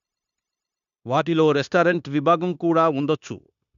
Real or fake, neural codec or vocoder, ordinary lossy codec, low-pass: fake; codec, 16 kHz, 0.9 kbps, LongCat-Audio-Codec; none; 7.2 kHz